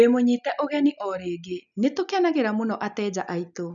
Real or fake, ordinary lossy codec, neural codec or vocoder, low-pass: real; none; none; 7.2 kHz